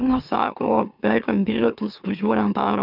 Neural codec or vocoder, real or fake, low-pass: autoencoder, 44.1 kHz, a latent of 192 numbers a frame, MeloTTS; fake; 5.4 kHz